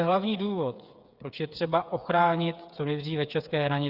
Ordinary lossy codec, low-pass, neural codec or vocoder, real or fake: Opus, 64 kbps; 5.4 kHz; codec, 16 kHz, 8 kbps, FreqCodec, smaller model; fake